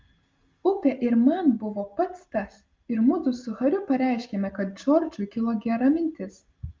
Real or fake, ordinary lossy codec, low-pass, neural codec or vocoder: real; Opus, 32 kbps; 7.2 kHz; none